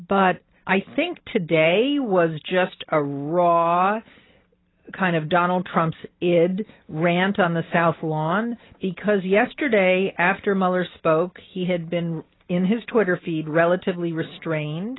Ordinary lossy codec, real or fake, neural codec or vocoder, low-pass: AAC, 16 kbps; real; none; 7.2 kHz